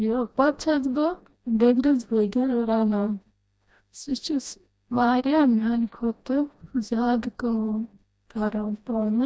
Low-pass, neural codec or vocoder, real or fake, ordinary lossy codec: none; codec, 16 kHz, 1 kbps, FreqCodec, smaller model; fake; none